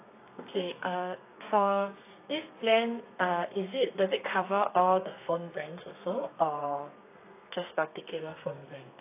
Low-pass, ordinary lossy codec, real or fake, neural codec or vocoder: 3.6 kHz; AAC, 24 kbps; fake; codec, 32 kHz, 1.9 kbps, SNAC